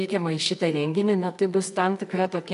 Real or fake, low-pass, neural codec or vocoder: fake; 10.8 kHz; codec, 24 kHz, 0.9 kbps, WavTokenizer, medium music audio release